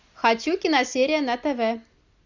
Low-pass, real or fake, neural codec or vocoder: 7.2 kHz; real; none